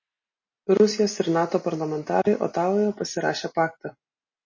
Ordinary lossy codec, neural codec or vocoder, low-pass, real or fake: MP3, 32 kbps; none; 7.2 kHz; real